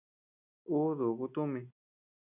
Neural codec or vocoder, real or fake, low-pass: none; real; 3.6 kHz